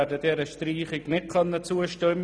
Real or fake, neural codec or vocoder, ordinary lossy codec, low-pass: real; none; none; none